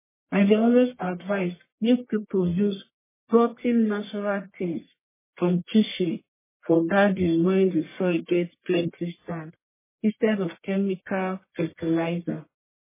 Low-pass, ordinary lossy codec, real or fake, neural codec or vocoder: 3.6 kHz; MP3, 16 kbps; fake; codec, 44.1 kHz, 1.7 kbps, Pupu-Codec